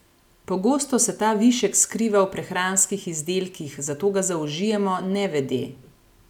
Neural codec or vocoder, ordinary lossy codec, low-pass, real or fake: none; none; 19.8 kHz; real